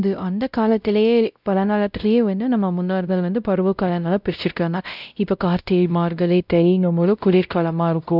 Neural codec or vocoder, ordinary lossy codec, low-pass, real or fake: codec, 16 kHz, 0.5 kbps, X-Codec, WavLM features, trained on Multilingual LibriSpeech; none; 5.4 kHz; fake